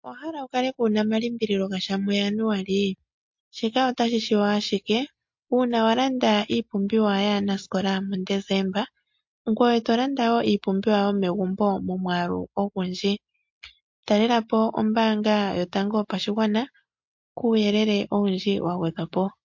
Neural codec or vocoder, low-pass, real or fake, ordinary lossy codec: none; 7.2 kHz; real; MP3, 48 kbps